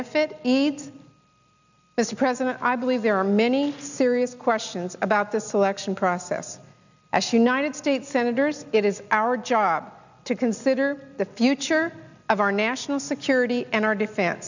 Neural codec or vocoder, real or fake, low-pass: none; real; 7.2 kHz